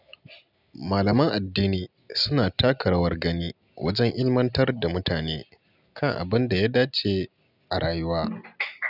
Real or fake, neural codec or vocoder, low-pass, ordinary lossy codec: real; none; 5.4 kHz; none